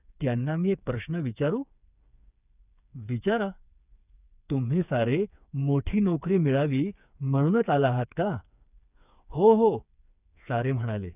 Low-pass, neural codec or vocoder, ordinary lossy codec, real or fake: 3.6 kHz; codec, 16 kHz, 4 kbps, FreqCodec, smaller model; none; fake